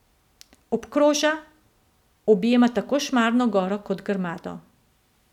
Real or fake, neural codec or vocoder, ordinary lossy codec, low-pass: real; none; none; 19.8 kHz